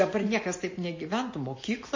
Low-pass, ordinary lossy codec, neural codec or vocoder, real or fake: 7.2 kHz; MP3, 32 kbps; none; real